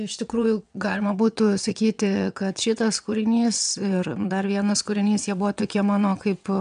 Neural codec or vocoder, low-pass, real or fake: vocoder, 22.05 kHz, 80 mel bands, WaveNeXt; 9.9 kHz; fake